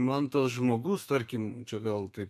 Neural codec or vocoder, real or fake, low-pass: codec, 32 kHz, 1.9 kbps, SNAC; fake; 14.4 kHz